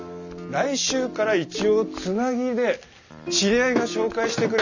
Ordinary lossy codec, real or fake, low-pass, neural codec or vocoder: none; real; 7.2 kHz; none